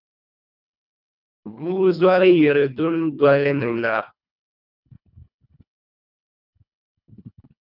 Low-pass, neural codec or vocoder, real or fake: 5.4 kHz; codec, 24 kHz, 1.5 kbps, HILCodec; fake